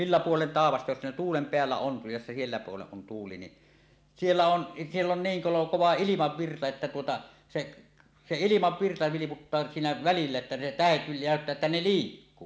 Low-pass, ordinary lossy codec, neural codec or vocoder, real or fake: none; none; none; real